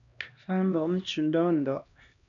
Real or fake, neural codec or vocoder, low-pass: fake; codec, 16 kHz, 1 kbps, X-Codec, HuBERT features, trained on LibriSpeech; 7.2 kHz